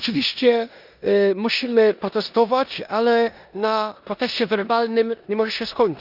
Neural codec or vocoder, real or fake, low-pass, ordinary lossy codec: codec, 16 kHz in and 24 kHz out, 0.9 kbps, LongCat-Audio-Codec, four codebook decoder; fake; 5.4 kHz; Opus, 64 kbps